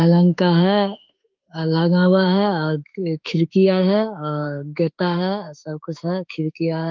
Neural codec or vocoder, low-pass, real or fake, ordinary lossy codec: autoencoder, 48 kHz, 32 numbers a frame, DAC-VAE, trained on Japanese speech; 7.2 kHz; fake; Opus, 32 kbps